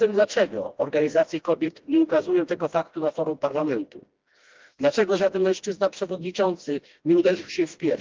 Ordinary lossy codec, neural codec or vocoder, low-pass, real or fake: Opus, 32 kbps; codec, 16 kHz, 1 kbps, FreqCodec, smaller model; 7.2 kHz; fake